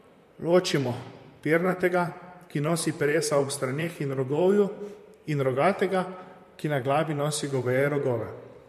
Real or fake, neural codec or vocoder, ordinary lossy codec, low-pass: fake; vocoder, 44.1 kHz, 128 mel bands, Pupu-Vocoder; MP3, 64 kbps; 14.4 kHz